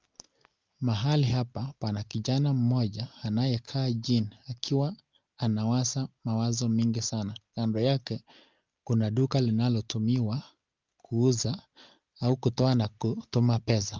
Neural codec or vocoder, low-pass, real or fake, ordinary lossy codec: none; 7.2 kHz; real; Opus, 32 kbps